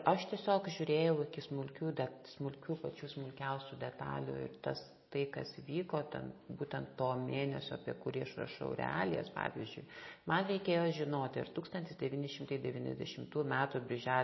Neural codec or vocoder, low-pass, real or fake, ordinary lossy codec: none; 7.2 kHz; real; MP3, 24 kbps